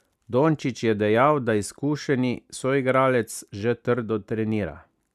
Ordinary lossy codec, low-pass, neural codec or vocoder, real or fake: none; 14.4 kHz; none; real